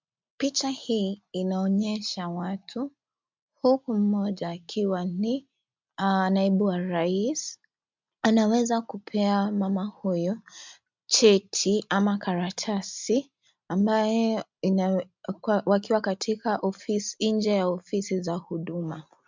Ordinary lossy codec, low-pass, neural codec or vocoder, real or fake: MP3, 64 kbps; 7.2 kHz; none; real